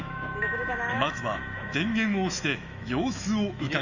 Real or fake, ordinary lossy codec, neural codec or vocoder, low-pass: fake; none; autoencoder, 48 kHz, 128 numbers a frame, DAC-VAE, trained on Japanese speech; 7.2 kHz